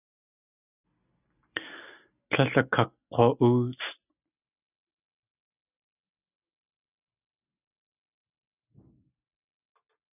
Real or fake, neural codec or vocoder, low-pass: real; none; 3.6 kHz